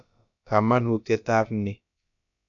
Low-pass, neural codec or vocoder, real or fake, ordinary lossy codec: 7.2 kHz; codec, 16 kHz, about 1 kbps, DyCAST, with the encoder's durations; fake; none